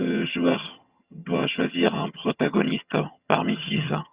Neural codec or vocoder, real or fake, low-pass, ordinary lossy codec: vocoder, 22.05 kHz, 80 mel bands, HiFi-GAN; fake; 3.6 kHz; Opus, 64 kbps